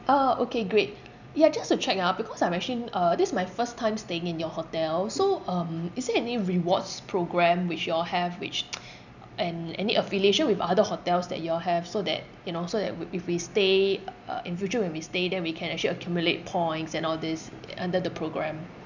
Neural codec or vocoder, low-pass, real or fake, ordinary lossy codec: none; 7.2 kHz; real; none